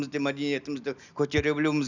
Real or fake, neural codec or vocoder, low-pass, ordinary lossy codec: real; none; 7.2 kHz; none